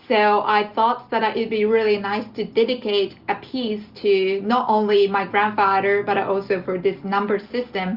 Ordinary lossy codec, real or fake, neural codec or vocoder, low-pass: Opus, 24 kbps; real; none; 5.4 kHz